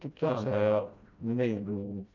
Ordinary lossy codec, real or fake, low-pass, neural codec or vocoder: none; fake; 7.2 kHz; codec, 16 kHz, 1 kbps, FreqCodec, smaller model